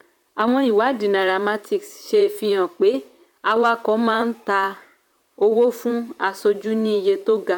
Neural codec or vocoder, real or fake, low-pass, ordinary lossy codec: vocoder, 44.1 kHz, 128 mel bands, Pupu-Vocoder; fake; 19.8 kHz; none